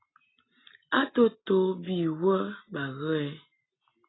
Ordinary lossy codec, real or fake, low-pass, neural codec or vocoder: AAC, 16 kbps; real; 7.2 kHz; none